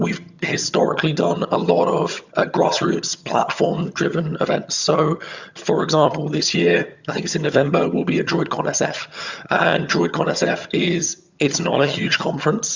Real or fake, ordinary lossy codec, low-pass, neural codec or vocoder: fake; Opus, 64 kbps; 7.2 kHz; vocoder, 22.05 kHz, 80 mel bands, HiFi-GAN